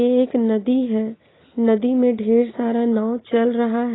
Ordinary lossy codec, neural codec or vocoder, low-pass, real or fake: AAC, 16 kbps; none; 7.2 kHz; real